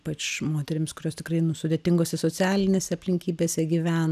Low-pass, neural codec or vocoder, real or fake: 14.4 kHz; none; real